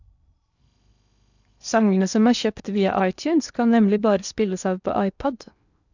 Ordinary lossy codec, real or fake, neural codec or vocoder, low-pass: none; fake; codec, 16 kHz in and 24 kHz out, 0.8 kbps, FocalCodec, streaming, 65536 codes; 7.2 kHz